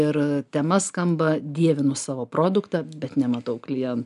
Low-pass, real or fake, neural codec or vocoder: 10.8 kHz; real; none